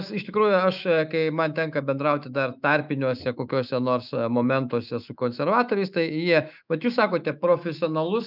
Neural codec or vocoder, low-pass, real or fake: autoencoder, 48 kHz, 128 numbers a frame, DAC-VAE, trained on Japanese speech; 5.4 kHz; fake